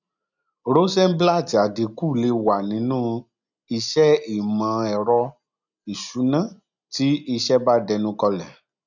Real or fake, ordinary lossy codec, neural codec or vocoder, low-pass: real; none; none; 7.2 kHz